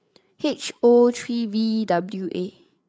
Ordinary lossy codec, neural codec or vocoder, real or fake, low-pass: none; codec, 16 kHz, 8 kbps, FreqCodec, larger model; fake; none